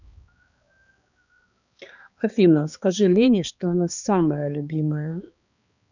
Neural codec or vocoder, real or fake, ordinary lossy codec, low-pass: codec, 16 kHz, 2 kbps, X-Codec, HuBERT features, trained on balanced general audio; fake; none; 7.2 kHz